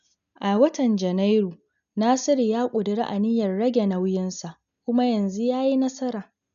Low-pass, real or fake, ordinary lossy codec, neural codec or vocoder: 7.2 kHz; real; none; none